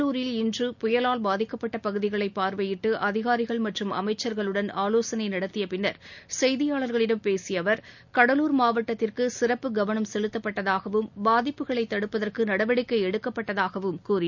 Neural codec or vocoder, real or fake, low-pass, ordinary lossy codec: none; real; 7.2 kHz; none